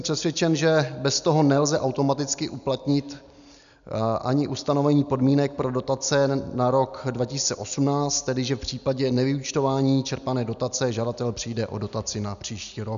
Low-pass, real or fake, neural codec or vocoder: 7.2 kHz; real; none